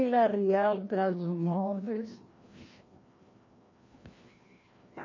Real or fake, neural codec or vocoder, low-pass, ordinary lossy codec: fake; codec, 16 kHz, 1 kbps, FreqCodec, larger model; 7.2 kHz; MP3, 32 kbps